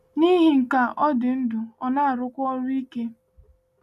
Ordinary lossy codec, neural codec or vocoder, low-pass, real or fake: none; none; 14.4 kHz; real